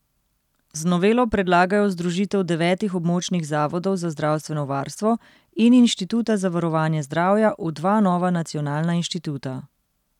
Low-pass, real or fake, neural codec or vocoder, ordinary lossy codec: 19.8 kHz; real; none; none